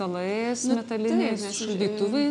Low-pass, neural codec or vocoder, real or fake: 10.8 kHz; none; real